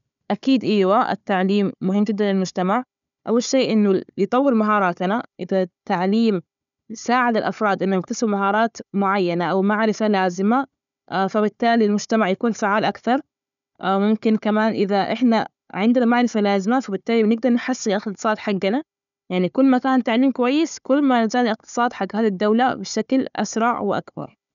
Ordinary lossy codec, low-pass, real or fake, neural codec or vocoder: none; 7.2 kHz; fake; codec, 16 kHz, 4 kbps, FunCodec, trained on Chinese and English, 50 frames a second